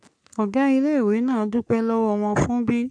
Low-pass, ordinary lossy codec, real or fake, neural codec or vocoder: 9.9 kHz; none; fake; codec, 44.1 kHz, 3.4 kbps, Pupu-Codec